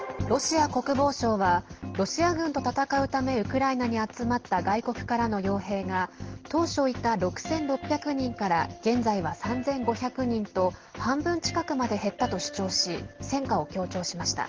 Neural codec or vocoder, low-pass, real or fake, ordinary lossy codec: none; 7.2 kHz; real; Opus, 16 kbps